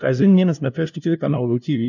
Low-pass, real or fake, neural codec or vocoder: 7.2 kHz; fake; codec, 16 kHz, 0.5 kbps, FunCodec, trained on LibriTTS, 25 frames a second